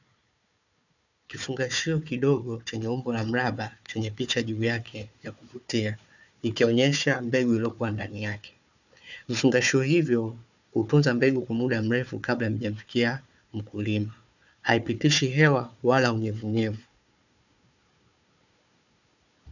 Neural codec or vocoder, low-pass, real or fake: codec, 16 kHz, 4 kbps, FunCodec, trained on Chinese and English, 50 frames a second; 7.2 kHz; fake